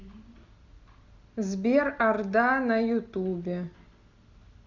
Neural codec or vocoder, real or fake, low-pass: none; real; 7.2 kHz